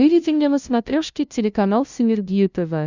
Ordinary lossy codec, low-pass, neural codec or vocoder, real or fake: Opus, 64 kbps; 7.2 kHz; codec, 16 kHz, 0.5 kbps, FunCodec, trained on LibriTTS, 25 frames a second; fake